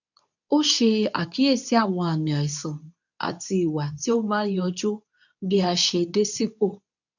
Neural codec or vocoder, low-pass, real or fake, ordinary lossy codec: codec, 24 kHz, 0.9 kbps, WavTokenizer, medium speech release version 2; 7.2 kHz; fake; none